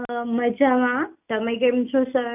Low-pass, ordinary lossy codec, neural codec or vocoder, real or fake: 3.6 kHz; none; none; real